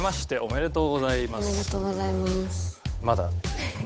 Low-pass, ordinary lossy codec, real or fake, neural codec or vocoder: none; none; fake; codec, 16 kHz, 8 kbps, FunCodec, trained on Chinese and English, 25 frames a second